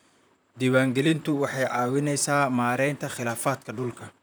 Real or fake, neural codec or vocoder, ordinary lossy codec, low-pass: fake; vocoder, 44.1 kHz, 128 mel bands, Pupu-Vocoder; none; none